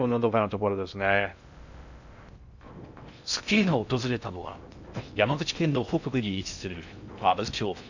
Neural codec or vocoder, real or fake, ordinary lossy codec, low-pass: codec, 16 kHz in and 24 kHz out, 0.6 kbps, FocalCodec, streaming, 2048 codes; fake; none; 7.2 kHz